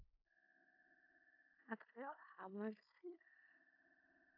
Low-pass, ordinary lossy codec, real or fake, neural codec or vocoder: 5.4 kHz; AAC, 32 kbps; fake; codec, 16 kHz in and 24 kHz out, 0.4 kbps, LongCat-Audio-Codec, four codebook decoder